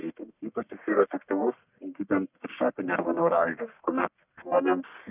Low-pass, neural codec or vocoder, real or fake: 3.6 kHz; codec, 44.1 kHz, 1.7 kbps, Pupu-Codec; fake